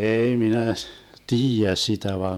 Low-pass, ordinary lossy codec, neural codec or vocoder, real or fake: 19.8 kHz; none; vocoder, 48 kHz, 128 mel bands, Vocos; fake